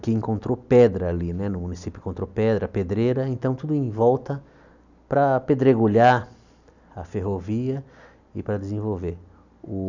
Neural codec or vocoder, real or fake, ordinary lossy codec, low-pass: none; real; none; 7.2 kHz